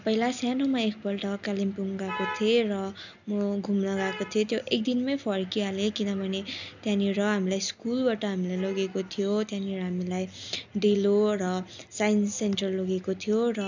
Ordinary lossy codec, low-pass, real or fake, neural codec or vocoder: none; 7.2 kHz; real; none